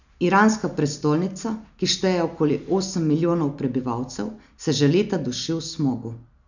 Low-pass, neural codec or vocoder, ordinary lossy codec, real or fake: 7.2 kHz; none; none; real